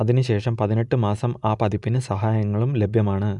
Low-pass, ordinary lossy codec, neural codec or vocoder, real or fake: 10.8 kHz; none; none; real